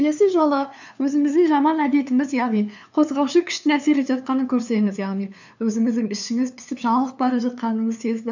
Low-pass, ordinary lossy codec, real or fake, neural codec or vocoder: 7.2 kHz; none; fake; codec, 16 kHz, 2 kbps, FunCodec, trained on LibriTTS, 25 frames a second